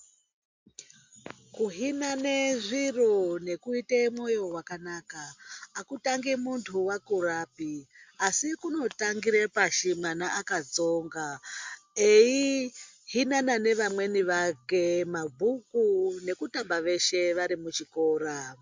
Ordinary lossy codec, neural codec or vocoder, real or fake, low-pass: MP3, 64 kbps; none; real; 7.2 kHz